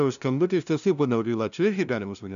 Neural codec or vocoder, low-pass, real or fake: codec, 16 kHz, 0.5 kbps, FunCodec, trained on LibriTTS, 25 frames a second; 7.2 kHz; fake